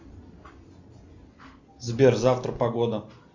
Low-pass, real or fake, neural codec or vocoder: 7.2 kHz; real; none